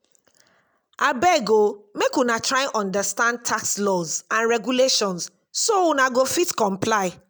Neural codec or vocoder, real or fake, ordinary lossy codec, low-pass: none; real; none; none